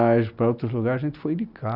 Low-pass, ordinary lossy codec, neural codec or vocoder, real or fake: 5.4 kHz; Opus, 64 kbps; none; real